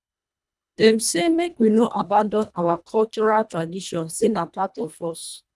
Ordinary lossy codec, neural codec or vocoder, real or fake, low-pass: none; codec, 24 kHz, 1.5 kbps, HILCodec; fake; none